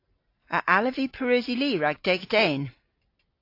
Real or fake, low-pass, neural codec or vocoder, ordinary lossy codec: real; 5.4 kHz; none; AAC, 32 kbps